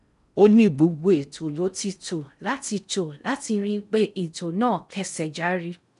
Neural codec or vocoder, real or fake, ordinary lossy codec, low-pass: codec, 16 kHz in and 24 kHz out, 0.6 kbps, FocalCodec, streaming, 4096 codes; fake; none; 10.8 kHz